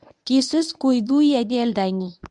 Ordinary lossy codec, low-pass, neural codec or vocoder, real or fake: none; 10.8 kHz; codec, 24 kHz, 0.9 kbps, WavTokenizer, medium speech release version 1; fake